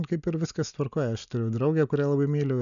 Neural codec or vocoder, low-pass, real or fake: none; 7.2 kHz; real